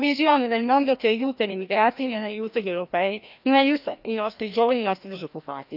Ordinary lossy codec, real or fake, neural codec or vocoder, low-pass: none; fake; codec, 16 kHz, 1 kbps, FreqCodec, larger model; 5.4 kHz